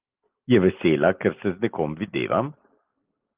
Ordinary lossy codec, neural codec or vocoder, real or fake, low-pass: Opus, 24 kbps; none; real; 3.6 kHz